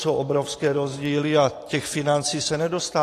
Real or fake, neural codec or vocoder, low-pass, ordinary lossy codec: fake; vocoder, 44.1 kHz, 128 mel bands every 512 samples, BigVGAN v2; 14.4 kHz; AAC, 48 kbps